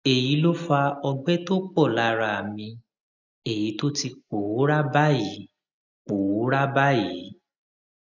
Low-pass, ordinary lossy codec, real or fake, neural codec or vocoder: 7.2 kHz; none; real; none